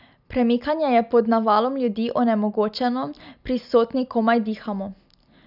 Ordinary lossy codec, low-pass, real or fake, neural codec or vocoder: none; 5.4 kHz; real; none